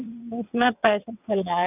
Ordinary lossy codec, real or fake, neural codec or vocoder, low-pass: none; fake; vocoder, 22.05 kHz, 80 mel bands, WaveNeXt; 3.6 kHz